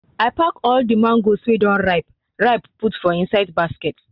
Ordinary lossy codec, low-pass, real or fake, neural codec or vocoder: none; 5.4 kHz; real; none